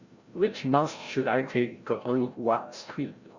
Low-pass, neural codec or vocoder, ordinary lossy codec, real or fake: 7.2 kHz; codec, 16 kHz, 0.5 kbps, FreqCodec, larger model; Opus, 64 kbps; fake